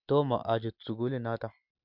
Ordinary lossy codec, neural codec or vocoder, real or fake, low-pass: MP3, 48 kbps; none; real; 5.4 kHz